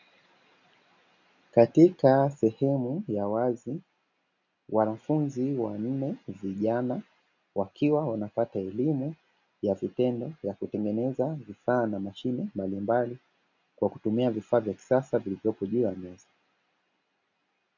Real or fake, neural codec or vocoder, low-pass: real; none; 7.2 kHz